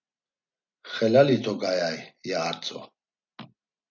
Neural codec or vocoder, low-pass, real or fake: none; 7.2 kHz; real